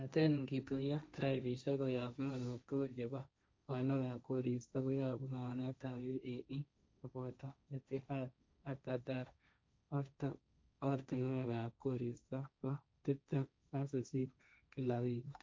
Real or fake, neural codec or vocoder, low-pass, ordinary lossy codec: fake; codec, 16 kHz, 1.1 kbps, Voila-Tokenizer; none; none